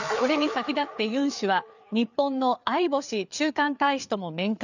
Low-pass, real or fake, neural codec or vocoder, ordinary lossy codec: 7.2 kHz; fake; codec, 16 kHz, 4 kbps, FreqCodec, larger model; none